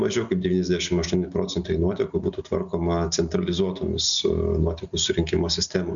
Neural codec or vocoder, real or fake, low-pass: none; real; 7.2 kHz